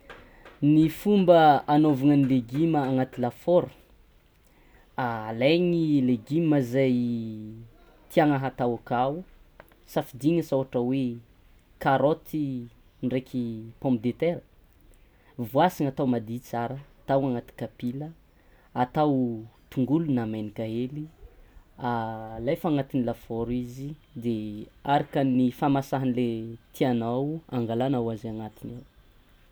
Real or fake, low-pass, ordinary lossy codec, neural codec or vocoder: real; none; none; none